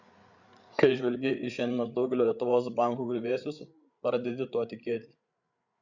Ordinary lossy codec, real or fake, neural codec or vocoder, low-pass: Opus, 64 kbps; fake; codec, 16 kHz, 8 kbps, FreqCodec, larger model; 7.2 kHz